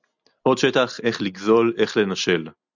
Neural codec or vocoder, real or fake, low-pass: none; real; 7.2 kHz